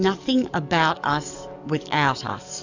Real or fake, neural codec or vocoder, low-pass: fake; codec, 44.1 kHz, 7.8 kbps, DAC; 7.2 kHz